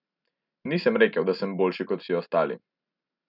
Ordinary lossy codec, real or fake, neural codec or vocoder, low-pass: none; real; none; 5.4 kHz